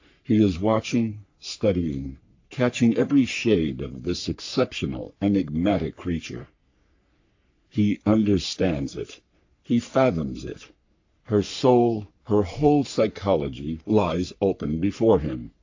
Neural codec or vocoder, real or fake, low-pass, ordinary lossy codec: codec, 44.1 kHz, 3.4 kbps, Pupu-Codec; fake; 7.2 kHz; AAC, 48 kbps